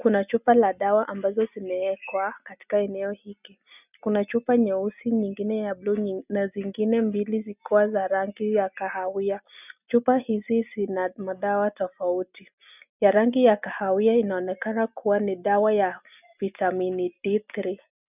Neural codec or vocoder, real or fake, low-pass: none; real; 3.6 kHz